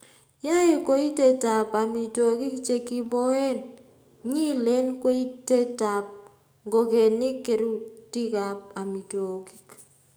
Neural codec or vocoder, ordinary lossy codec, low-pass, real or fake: codec, 44.1 kHz, 7.8 kbps, DAC; none; none; fake